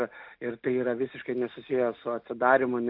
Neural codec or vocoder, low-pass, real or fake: none; 5.4 kHz; real